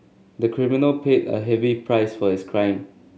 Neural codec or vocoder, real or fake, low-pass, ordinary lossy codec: none; real; none; none